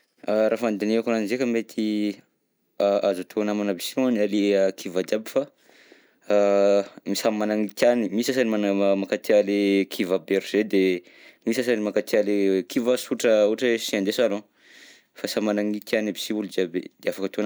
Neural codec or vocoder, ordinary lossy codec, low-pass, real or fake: none; none; none; real